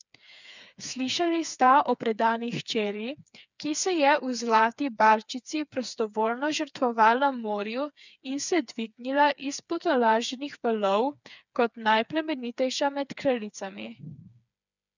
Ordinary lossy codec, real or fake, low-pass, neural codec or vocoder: none; fake; 7.2 kHz; codec, 16 kHz, 4 kbps, FreqCodec, smaller model